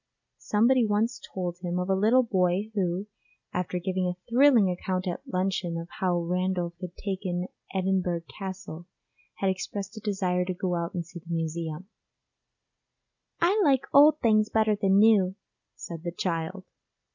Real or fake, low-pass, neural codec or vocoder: real; 7.2 kHz; none